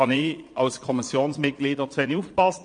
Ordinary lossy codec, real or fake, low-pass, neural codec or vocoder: MP3, 48 kbps; fake; 9.9 kHz; vocoder, 22.05 kHz, 80 mel bands, Vocos